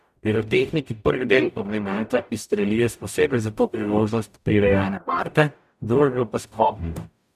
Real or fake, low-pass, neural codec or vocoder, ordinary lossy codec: fake; 14.4 kHz; codec, 44.1 kHz, 0.9 kbps, DAC; none